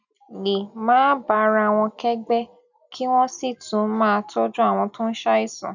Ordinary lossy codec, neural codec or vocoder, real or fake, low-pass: none; none; real; 7.2 kHz